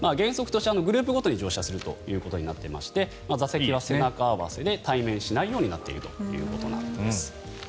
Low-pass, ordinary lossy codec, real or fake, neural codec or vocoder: none; none; real; none